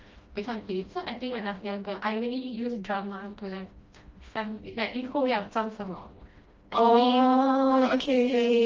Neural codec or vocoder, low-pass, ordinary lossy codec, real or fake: codec, 16 kHz, 1 kbps, FreqCodec, smaller model; 7.2 kHz; Opus, 24 kbps; fake